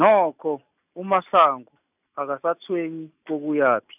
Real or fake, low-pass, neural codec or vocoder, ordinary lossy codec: real; 3.6 kHz; none; none